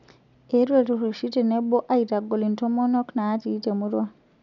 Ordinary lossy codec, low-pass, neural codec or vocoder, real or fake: none; 7.2 kHz; none; real